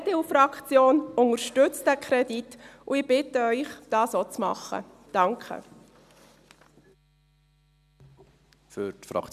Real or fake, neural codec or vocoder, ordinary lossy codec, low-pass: real; none; none; 14.4 kHz